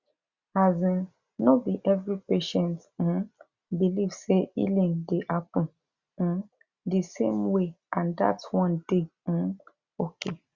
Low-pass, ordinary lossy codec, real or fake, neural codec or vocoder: 7.2 kHz; Opus, 64 kbps; real; none